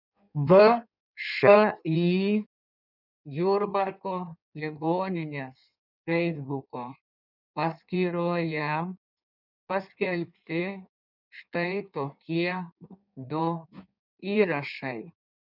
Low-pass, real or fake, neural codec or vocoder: 5.4 kHz; fake; codec, 16 kHz in and 24 kHz out, 1.1 kbps, FireRedTTS-2 codec